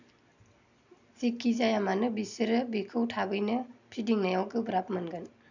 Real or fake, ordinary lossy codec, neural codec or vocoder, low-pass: real; none; none; 7.2 kHz